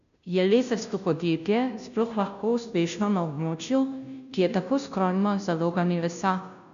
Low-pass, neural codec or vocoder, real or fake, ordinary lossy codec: 7.2 kHz; codec, 16 kHz, 0.5 kbps, FunCodec, trained on Chinese and English, 25 frames a second; fake; none